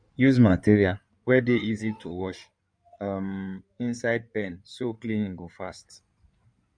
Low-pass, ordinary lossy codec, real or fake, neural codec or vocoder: 9.9 kHz; none; fake; codec, 16 kHz in and 24 kHz out, 2.2 kbps, FireRedTTS-2 codec